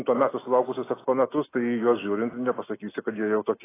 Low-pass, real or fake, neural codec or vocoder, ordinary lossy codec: 3.6 kHz; real; none; AAC, 16 kbps